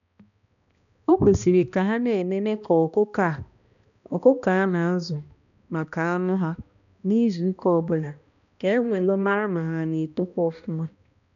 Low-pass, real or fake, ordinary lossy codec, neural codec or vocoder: 7.2 kHz; fake; none; codec, 16 kHz, 1 kbps, X-Codec, HuBERT features, trained on balanced general audio